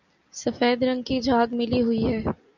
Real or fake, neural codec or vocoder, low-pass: real; none; 7.2 kHz